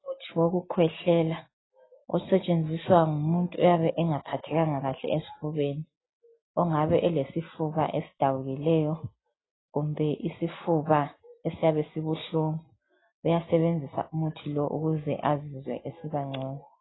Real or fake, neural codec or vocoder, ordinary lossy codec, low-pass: real; none; AAC, 16 kbps; 7.2 kHz